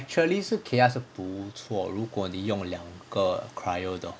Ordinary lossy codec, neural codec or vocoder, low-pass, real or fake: none; none; none; real